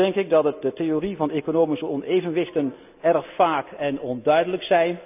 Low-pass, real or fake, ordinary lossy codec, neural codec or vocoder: 3.6 kHz; real; none; none